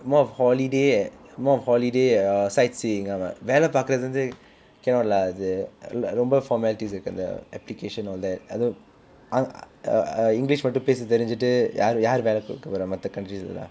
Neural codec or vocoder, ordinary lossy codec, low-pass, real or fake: none; none; none; real